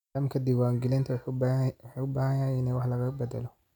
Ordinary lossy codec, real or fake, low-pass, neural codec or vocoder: none; real; 19.8 kHz; none